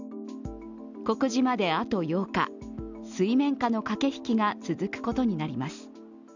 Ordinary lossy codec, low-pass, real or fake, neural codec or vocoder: none; 7.2 kHz; real; none